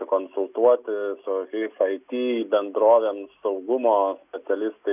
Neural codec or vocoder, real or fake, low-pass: none; real; 3.6 kHz